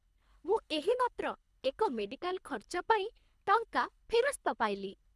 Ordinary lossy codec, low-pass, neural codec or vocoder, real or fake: none; none; codec, 24 kHz, 3 kbps, HILCodec; fake